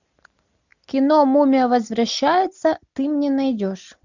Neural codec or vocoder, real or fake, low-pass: none; real; 7.2 kHz